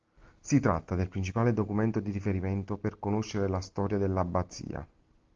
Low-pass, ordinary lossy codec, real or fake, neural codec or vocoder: 7.2 kHz; Opus, 32 kbps; real; none